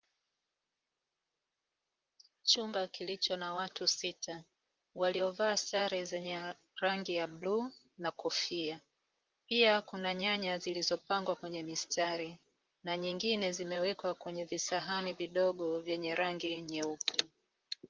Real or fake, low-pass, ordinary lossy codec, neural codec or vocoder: fake; 7.2 kHz; Opus, 24 kbps; vocoder, 44.1 kHz, 128 mel bands, Pupu-Vocoder